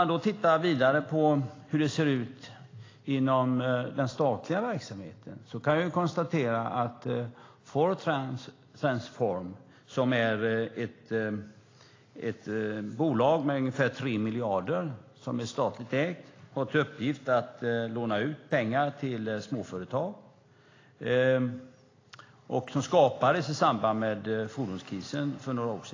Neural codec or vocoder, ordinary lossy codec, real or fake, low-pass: none; AAC, 32 kbps; real; 7.2 kHz